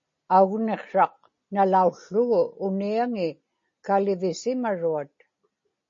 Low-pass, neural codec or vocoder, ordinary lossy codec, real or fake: 7.2 kHz; none; MP3, 32 kbps; real